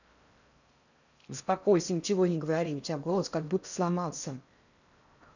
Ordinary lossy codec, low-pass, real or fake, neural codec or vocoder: none; 7.2 kHz; fake; codec, 16 kHz in and 24 kHz out, 0.6 kbps, FocalCodec, streaming, 4096 codes